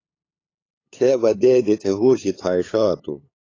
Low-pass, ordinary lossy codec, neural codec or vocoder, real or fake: 7.2 kHz; AAC, 32 kbps; codec, 16 kHz, 8 kbps, FunCodec, trained on LibriTTS, 25 frames a second; fake